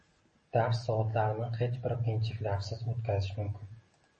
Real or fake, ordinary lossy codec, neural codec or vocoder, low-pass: real; MP3, 32 kbps; none; 10.8 kHz